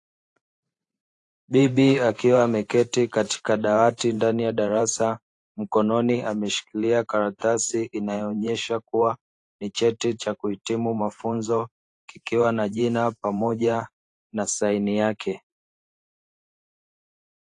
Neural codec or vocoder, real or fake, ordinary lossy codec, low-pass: vocoder, 44.1 kHz, 128 mel bands every 512 samples, BigVGAN v2; fake; AAC, 48 kbps; 10.8 kHz